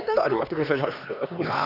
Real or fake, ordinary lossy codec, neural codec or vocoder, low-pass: fake; none; codec, 16 kHz, 2 kbps, X-Codec, HuBERT features, trained on LibriSpeech; 5.4 kHz